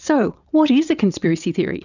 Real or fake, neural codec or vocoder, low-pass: fake; vocoder, 22.05 kHz, 80 mel bands, WaveNeXt; 7.2 kHz